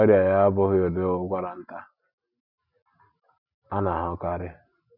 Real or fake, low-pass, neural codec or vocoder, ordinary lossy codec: real; 5.4 kHz; none; none